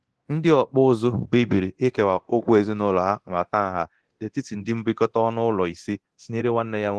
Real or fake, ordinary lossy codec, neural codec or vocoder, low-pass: fake; Opus, 16 kbps; codec, 24 kHz, 0.9 kbps, DualCodec; 10.8 kHz